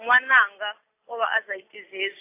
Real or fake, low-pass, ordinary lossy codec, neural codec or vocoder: real; 3.6 kHz; AAC, 24 kbps; none